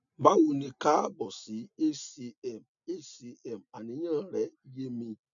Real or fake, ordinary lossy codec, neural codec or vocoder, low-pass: real; none; none; 7.2 kHz